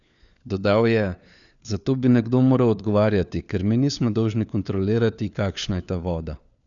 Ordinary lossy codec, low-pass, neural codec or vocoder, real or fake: none; 7.2 kHz; codec, 16 kHz, 4 kbps, FunCodec, trained on LibriTTS, 50 frames a second; fake